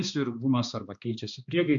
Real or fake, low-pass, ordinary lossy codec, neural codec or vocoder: fake; 7.2 kHz; AAC, 64 kbps; codec, 16 kHz, 2 kbps, X-Codec, HuBERT features, trained on balanced general audio